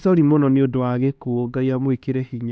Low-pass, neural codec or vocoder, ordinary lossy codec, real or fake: none; codec, 16 kHz, 2 kbps, X-Codec, HuBERT features, trained on LibriSpeech; none; fake